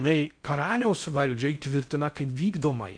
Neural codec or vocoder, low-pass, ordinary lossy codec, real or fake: codec, 16 kHz in and 24 kHz out, 0.6 kbps, FocalCodec, streaming, 4096 codes; 9.9 kHz; MP3, 64 kbps; fake